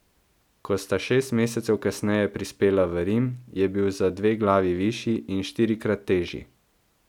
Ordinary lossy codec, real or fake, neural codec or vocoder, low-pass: none; real; none; 19.8 kHz